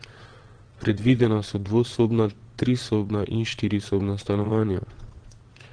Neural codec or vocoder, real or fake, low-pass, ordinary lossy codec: vocoder, 22.05 kHz, 80 mel bands, WaveNeXt; fake; 9.9 kHz; Opus, 16 kbps